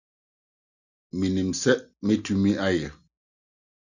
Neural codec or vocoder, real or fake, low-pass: none; real; 7.2 kHz